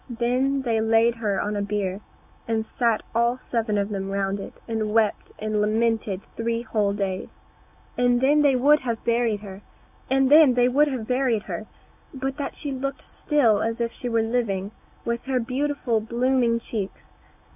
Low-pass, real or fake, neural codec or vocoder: 3.6 kHz; real; none